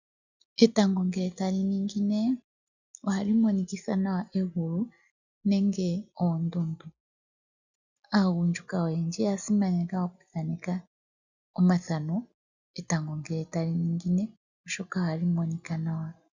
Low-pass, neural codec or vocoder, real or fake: 7.2 kHz; none; real